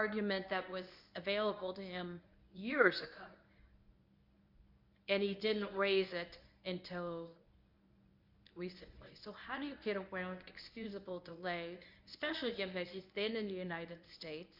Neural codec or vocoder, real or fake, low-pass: codec, 24 kHz, 0.9 kbps, WavTokenizer, medium speech release version 1; fake; 5.4 kHz